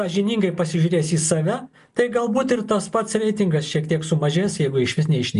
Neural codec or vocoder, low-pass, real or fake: none; 10.8 kHz; real